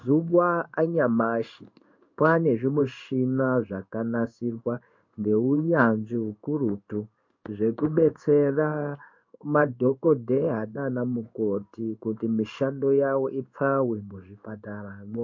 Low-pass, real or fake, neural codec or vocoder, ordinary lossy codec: 7.2 kHz; fake; codec, 16 kHz in and 24 kHz out, 1 kbps, XY-Tokenizer; MP3, 48 kbps